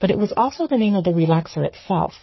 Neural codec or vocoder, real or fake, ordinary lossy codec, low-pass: codec, 44.1 kHz, 3.4 kbps, Pupu-Codec; fake; MP3, 24 kbps; 7.2 kHz